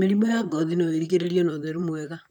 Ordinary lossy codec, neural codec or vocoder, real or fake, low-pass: none; vocoder, 44.1 kHz, 128 mel bands, Pupu-Vocoder; fake; 19.8 kHz